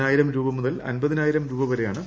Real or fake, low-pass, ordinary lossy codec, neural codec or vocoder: real; none; none; none